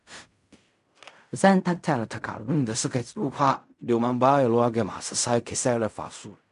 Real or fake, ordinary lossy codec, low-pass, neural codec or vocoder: fake; none; 10.8 kHz; codec, 16 kHz in and 24 kHz out, 0.4 kbps, LongCat-Audio-Codec, fine tuned four codebook decoder